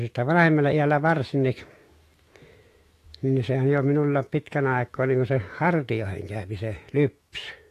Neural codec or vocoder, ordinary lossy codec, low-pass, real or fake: autoencoder, 48 kHz, 128 numbers a frame, DAC-VAE, trained on Japanese speech; AAC, 48 kbps; 14.4 kHz; fake